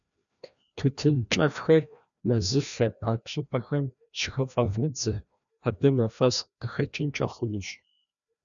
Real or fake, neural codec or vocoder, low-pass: fake; codec, 16 kHz, 1 kbps, FreqCodec, larger model; 7.2 kHz